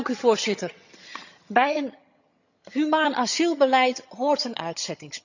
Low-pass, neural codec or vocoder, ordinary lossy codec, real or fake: 7.2 kHz; vocoder, 22.05 kHz, 80 mel bands, HiFi-GAN; none; fake